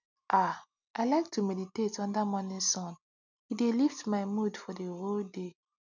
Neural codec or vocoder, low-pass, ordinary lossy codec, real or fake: none; 7.2 kHz; none; real